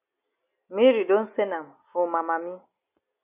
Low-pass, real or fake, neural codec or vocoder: 3.6 kHz; real; none